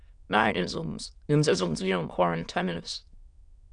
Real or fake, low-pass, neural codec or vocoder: fake; 9.9 kHz; autoencoder, 22.05 kHz, a latent of 192 numbers a frame, VITS, trained on many speakers